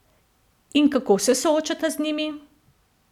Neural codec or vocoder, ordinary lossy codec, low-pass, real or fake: none; none; 19.8 kHz; real